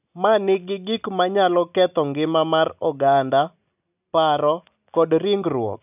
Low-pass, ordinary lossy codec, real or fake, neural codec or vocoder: 3.6 kHz; none; real; none